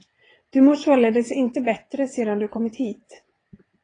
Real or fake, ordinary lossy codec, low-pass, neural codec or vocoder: fake; AAC, 32 kbps; 9.9 kHz; vocoder, 22.05 kHz, 80 mel bands, WaveNeXt